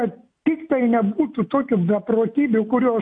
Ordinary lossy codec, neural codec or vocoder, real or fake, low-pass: AAC, 64 kbps; none; real; 9.9 kHz